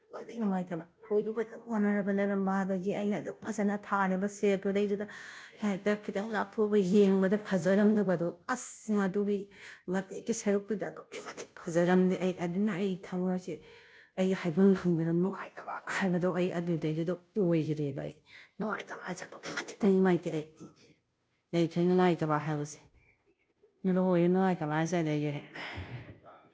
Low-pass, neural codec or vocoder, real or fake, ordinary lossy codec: none; codec, 16 kHz, 0.5 kbps, FunCodec, trained on Chinese and English, 25 frames a second; fake; none